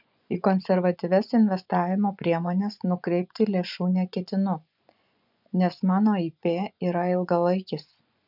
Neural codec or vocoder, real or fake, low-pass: vocoder, 24 kHz, 100 mel bands, Vocos; fake; 5.4 kHz